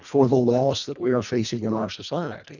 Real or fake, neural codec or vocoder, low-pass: fake; codec, 24 kHz, 1.5 kbps, HILCodec; 7.2 kHz